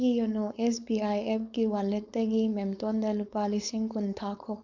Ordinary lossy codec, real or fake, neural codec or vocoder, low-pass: MP3, 64 kbps; fake; codec, 16 kHz, 4.8 kbps, FACodec; 7.2 kHz